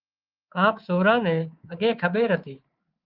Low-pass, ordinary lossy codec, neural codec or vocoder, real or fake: 5.4 kHz; Opus, 32 kbps; codec, 24 kHz, 3.1 kbps, DualCodec; fake